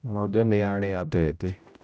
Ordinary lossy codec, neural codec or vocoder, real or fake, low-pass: none; codec, 16 kHz, 0.5 kbps, X-Codec, HuBERT features, trained on general audio; fake; none